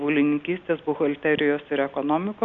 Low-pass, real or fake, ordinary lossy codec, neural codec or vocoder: 7.2 kHz; real; Opus, 64 kbps; none